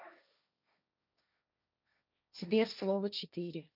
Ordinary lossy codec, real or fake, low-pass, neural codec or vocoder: none; fake; 5.4 kHz; codec, 16 kHz, 1.1 kbps, Voila-Tokenizer